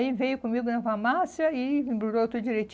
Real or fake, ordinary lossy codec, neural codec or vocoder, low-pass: real; none; none; none